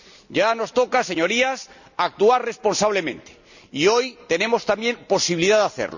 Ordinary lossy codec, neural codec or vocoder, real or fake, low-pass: none; none; real; 7.2 kHz